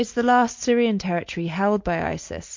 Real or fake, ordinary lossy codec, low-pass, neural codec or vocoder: real; MP3, 48 kbps; 7.2 kHz; none